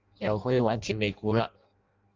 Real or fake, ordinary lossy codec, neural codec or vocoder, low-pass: fake; Opus, 32 kbps; codec, 16 kHz in and 24 kHz out, 0.6 kbps, FireRedTTS-2 codec; 7.2 kHz